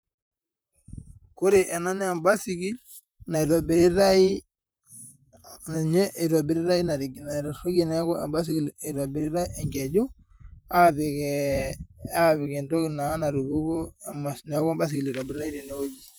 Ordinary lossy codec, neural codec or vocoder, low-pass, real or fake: none; vocoder, 44.1 kHz, 128 mel bands, Pupu-Vocoder; none; fake